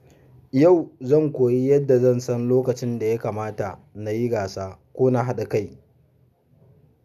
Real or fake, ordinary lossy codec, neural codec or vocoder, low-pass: real; none; none; 14.4 kHz